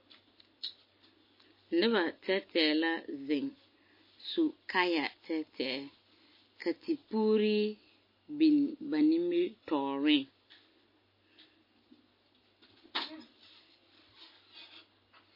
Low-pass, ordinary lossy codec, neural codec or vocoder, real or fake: 5.4 kHz; MP3, 24 kbps; none; real